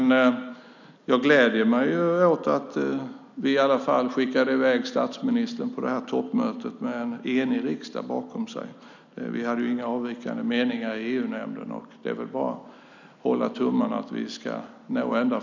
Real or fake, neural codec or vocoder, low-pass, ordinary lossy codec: real; none; 7.2 kHz; none